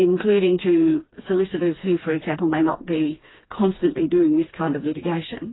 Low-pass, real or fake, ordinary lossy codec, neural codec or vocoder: 7.2 kHz; fake; AAC, 16 kbps; codec, 16 kHz, 2 kbps, FreqCodec, smaller model